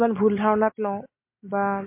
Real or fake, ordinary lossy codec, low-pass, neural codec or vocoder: real; MP3, 24 kbps; 3.6 kHz; none